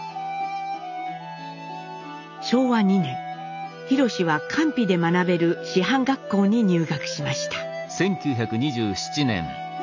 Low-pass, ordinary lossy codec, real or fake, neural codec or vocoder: 7.2 kHz; none; real; none